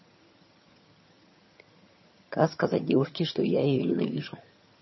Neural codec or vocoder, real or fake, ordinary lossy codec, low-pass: vocoder, 22.05 kHz, 80 mel bands, HiFi-GAN; fake; MP3, 24 kbps; 7.2 kHz